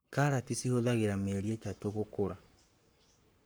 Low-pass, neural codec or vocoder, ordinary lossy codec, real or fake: none; codec, 44.1 kHz, 7.8 kbps, Pupu-Codec; none; fake